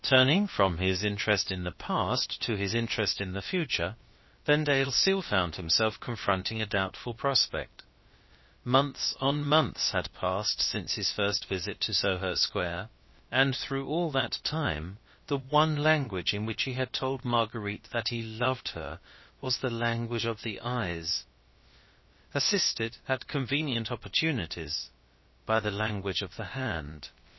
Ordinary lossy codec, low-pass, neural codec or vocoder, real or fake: MP3, 24 kbps; 7.2 kHz; codec, 16 kHz, about 1 kbps, DyCAST, with the encoder's durations; fake